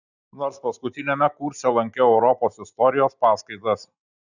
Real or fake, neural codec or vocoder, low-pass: real; none; 7.2 kHz